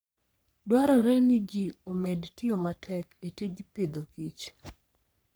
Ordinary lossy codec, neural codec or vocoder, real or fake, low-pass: none; codec, 44.1 kHz, 3.4 kbps, Pupu-Codec; fake; none